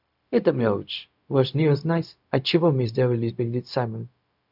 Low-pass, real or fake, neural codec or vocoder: 5.4 kHz; fake; codec, 16 kHz, 0.4 kbps, LongCat-Audio-Codec